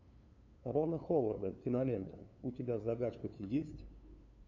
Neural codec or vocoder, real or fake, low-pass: codec, 16 kHz, 2 kbps, FunCodec, trained on LibriTTS, 25 frames a second; fake; 7.2 kHz